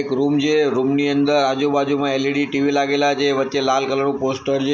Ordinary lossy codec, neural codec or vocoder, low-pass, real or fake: none; none; none; real